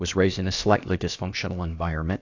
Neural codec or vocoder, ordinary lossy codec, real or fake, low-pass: codec, 16 kHz, about 1 kbps, DyCAST, with the encoder's durations; AAC, 48 kbps; fake; 7.2 kHz